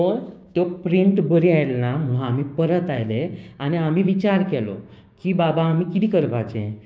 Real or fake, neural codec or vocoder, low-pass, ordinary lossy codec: fake; codec, 16 kHz, 6 kbps, DAC; none; none